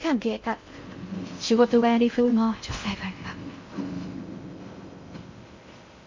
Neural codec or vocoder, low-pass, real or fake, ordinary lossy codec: codec, 16 kHz in and 24 kHz out, 0.6 kbps, FocalCodec, streaming, 2048 codes; 7.2 kHz; fake; MP3, 48 kbps